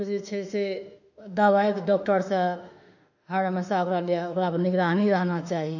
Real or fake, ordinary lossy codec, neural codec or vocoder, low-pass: fake; none; autoencoder, 48 kHz, 32 numbers a frame, DAC-VAE, trained on Japanese speech; 7.2 kHz